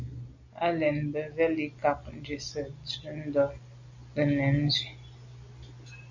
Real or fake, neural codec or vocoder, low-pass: real; none; 7.2 kHz